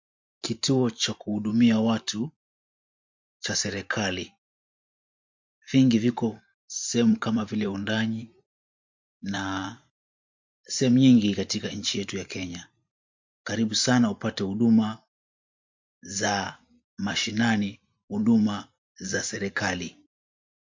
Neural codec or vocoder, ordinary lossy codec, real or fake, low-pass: vocoder, 44.1 kHz, 128 mel bands every 512 samples, BigVGAN v2; MP3, 48 kbps; fake; 7.2 kHz